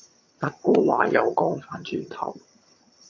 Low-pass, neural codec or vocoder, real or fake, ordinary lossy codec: 7.2 kHz; vocoder, 22.05 kHz, 80 mel bands, HiFi-GAN; fake; MP3, 32 kbps